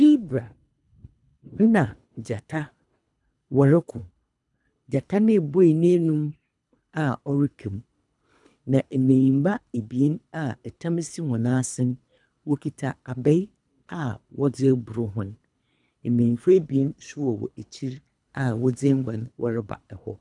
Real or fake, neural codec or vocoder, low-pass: fake; codec, 24 kHz, 3 kbps, HILCodec; 10.8 kHz